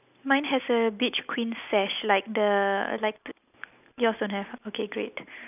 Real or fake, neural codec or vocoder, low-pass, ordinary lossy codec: real; none; 3.6 kHz; none